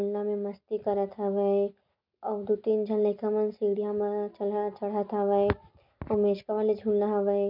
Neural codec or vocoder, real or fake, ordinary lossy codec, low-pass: none; real; none; 5.4 kHz